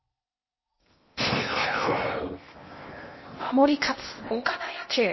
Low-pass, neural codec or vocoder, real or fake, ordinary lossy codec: 7.2 kHz; codec, 16 kHz in and 24 kHz out, 0.8 kbps, FocalCodec, streaming, 65536 codes; fake; MP3, 24 kbps